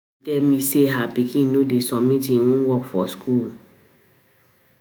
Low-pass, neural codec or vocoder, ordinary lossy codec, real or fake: none; autoencoder, 48 kHz, 128 numbers a frame, DAC-VAE, trained on Japanese speech; none; fake